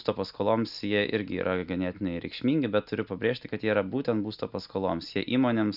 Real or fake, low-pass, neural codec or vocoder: real; 5.4 kHz; none